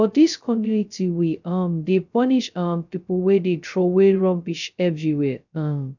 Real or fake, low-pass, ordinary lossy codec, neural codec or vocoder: fake; 7.2 kHz; none; codec, 16 kHz, 0.2 kbps, FocalCodec